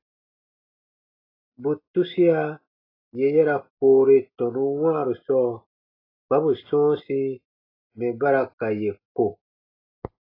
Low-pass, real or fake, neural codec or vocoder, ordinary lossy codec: 5.4 kHz; real; none; AAC, 24 kbps